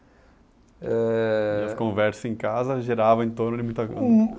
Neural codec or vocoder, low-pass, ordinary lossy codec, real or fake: none; none; none; real